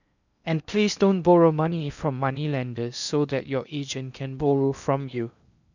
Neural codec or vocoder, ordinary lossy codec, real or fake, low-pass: codec, 16 kHz in and 24 kHz out, 0.8 kbps, FocalCodec, streaming, 65536 codes; none; fake; 7.2 kHz